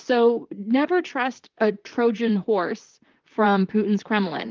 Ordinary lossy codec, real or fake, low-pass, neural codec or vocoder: Opus, 32 kbps; fake; 7.2 kHz; vocoder, 44.1 kHz, 128 mel bands, Pupu-Vocoder